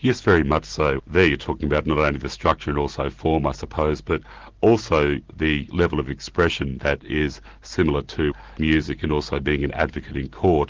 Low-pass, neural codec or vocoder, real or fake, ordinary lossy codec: 7.2 kHz; none; real; Opus, 16 kbps